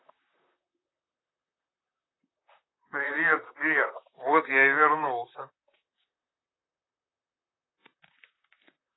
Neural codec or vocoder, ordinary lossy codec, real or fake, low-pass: vocoder, 44.1 kHz, 128 mel bands, Pupu-Vocoder; AAC, 16 kbps; fake; 7.2 kHz